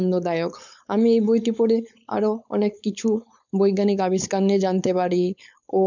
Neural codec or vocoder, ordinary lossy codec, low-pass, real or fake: codec, 16 kHz, 4.8 kbps, FACodec; none; 7.2 kHz; fake